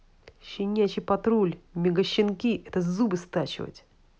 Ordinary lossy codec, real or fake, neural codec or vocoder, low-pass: none; real; none; none